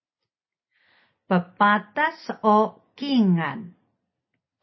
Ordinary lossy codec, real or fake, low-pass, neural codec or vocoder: MP3, 24 kbps; real; 7.2 kHz; none